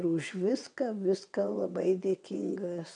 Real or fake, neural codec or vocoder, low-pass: fake; vocoder, 22.05 kHz, 80 mel bands, WaveNeXt; 9.9 kHz